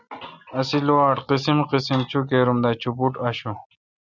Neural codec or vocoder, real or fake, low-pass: none; real; 7.2 kHz